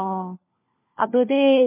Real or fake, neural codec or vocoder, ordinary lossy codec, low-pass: fake; codec, 16 kHz, 1 kbps, FunCodec, trained on LibriTTS, 50 frames a second; MP3, 24 kbps; 3.6 kHz